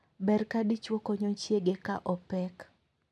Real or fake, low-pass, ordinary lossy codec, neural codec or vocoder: real; none; none; none